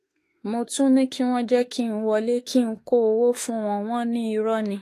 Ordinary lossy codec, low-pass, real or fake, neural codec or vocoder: AAC, 48 kbps; 14.4 kHz; fake; autoencoder, 48 kHz, 32 numbers a frame, DAC-VAE, trained on Japanese speech